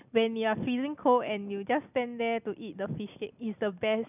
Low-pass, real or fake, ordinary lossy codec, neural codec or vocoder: 3.6 kHz; real; none; none